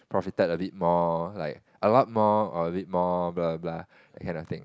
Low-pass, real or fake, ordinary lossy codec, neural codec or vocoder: none; real; none; none